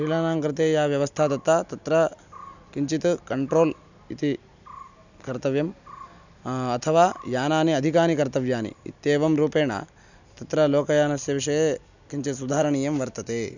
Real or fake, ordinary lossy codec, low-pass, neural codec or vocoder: real; none; 7.2 kHz; none